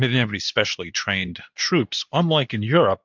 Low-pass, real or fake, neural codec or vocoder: 7.2 kHz; fake; codec, 24 kHz, 0.9 kbps, WavTokenizer, medium speech release version 2